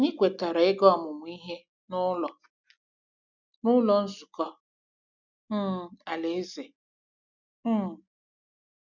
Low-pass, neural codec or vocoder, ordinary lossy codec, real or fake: 7.2 kHz; none; none; real